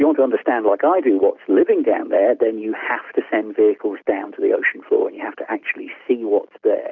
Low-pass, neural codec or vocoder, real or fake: 7.2 kHz; none; real